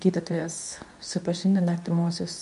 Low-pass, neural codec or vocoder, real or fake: 10.8 kHz; codec, 24 kHz, 0.9 kbps, WavTokenizer, medium speech release version 2; fake